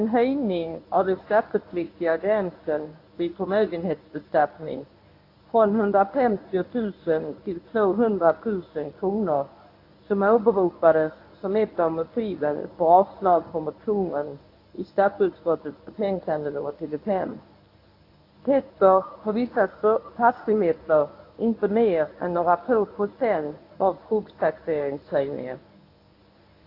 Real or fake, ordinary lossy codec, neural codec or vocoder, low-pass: fake; AAC, 32 kbps; codec, 24 kHz, 0.9 kbps, WavTokenizer, medium speech release version 1; 5.4 kHz